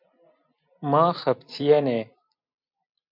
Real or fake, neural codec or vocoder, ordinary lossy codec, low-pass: fake; vocoder, 44.1 kHz, 128 mel bands every 256 samples, BigVGAN v2; MP3, 48 kbps; 5.4 kHz